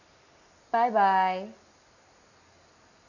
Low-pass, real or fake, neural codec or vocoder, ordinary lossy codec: 7.2 kHz; real; none; none